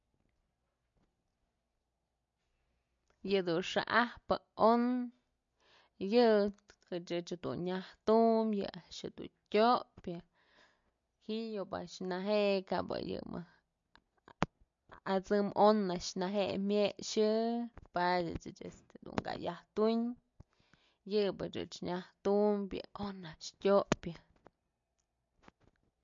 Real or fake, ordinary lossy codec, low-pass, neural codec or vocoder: real; MP3, 48 kbps; 7.2 kHz; none